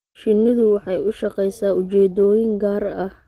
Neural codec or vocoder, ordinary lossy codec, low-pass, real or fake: none; Opus, 16 kbps; 14.4 kHz; real